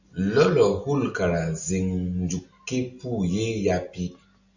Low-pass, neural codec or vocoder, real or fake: 7.2 kHz; none; real